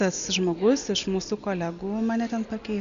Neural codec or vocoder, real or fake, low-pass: none; real; 7.2 kHz